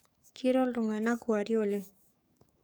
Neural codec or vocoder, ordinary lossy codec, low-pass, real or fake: codec, 44.1 kHz, 7.8 kbps, DAC; none; none; fake